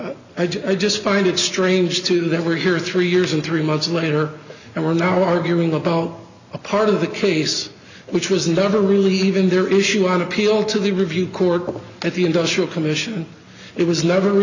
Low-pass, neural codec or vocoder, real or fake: 7.2 kHz; none; real